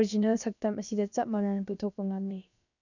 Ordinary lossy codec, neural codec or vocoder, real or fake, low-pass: none; codec, 16 kHz, about 1 kbps, DyCAST, with the encoder's durations; fake; 7.2 kHz